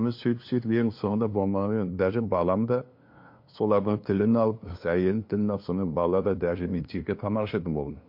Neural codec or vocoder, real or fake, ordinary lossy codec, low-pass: codec, 16 kHz, 2 kbps, FunCodec, trained on LibriTTS, 25 frames a second; fake; MP3, 32 kbps; 5.4 kHz